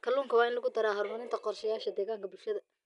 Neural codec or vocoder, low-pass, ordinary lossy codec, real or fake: none; 10.8 kHz; none; real